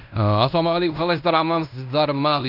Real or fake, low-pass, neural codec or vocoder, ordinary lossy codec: fake; 5.4 kHz; codec, 16 kHz in and 24 kHz out, 0.9 kbps, LongCat-Audio-Codec, fine tuned four codebook decoder; none